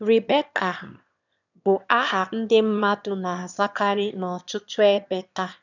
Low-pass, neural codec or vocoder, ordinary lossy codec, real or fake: 7.2 kHz; autoencoder, 22.05 kHz, a latent of 192 numbers a frame, VITS, trained on one speaker; none; fake